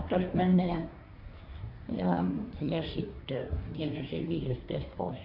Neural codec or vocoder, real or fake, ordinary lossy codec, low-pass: codec, 24 kHz, 1 kbps, SNAC; fake; none; 5.4 kHz